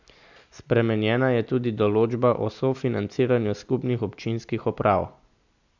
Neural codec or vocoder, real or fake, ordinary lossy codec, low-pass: none; real; none; 7.2 kHz